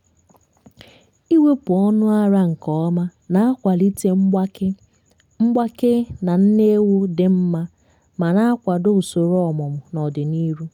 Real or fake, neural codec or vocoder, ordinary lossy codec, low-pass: real; none; none; 19.8 kHz